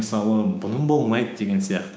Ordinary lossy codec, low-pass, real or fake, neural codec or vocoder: none; none; fake; codec, 16 kHz, 6 kbps, DAC